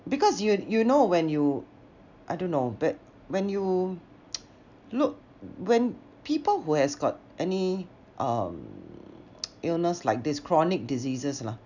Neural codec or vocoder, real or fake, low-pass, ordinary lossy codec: none; real; 7.2 kHz; none